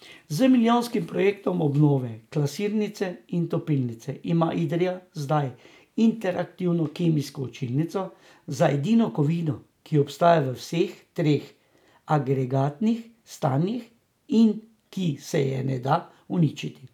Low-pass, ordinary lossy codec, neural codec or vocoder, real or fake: 19.8 kHz; none; none; real